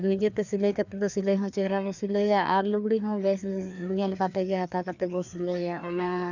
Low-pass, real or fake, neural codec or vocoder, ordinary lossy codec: 7.2 kHz; fake; codec, 16 kHz, 2 kbps, FreqCodec, larger model; none